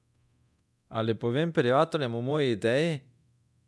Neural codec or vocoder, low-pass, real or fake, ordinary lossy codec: codec, 24 kHz, 0.9 kbps, DualCodec; none; fake; none